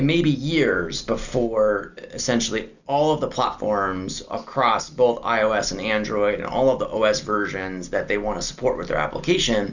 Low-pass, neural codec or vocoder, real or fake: 7.2 kHz; none; real